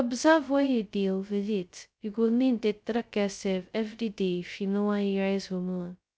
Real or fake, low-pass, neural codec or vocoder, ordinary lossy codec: fake; none; codec, 16 kHz, 0.2 kbps, FocalCodec; none